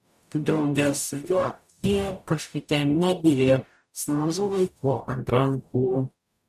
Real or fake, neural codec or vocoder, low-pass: fake; codec, 44.1 kHz, 0.9 kbps, DAC; 14.4 kHz